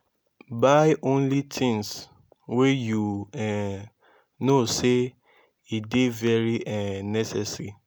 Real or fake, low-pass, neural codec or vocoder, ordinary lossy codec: real; none; none; none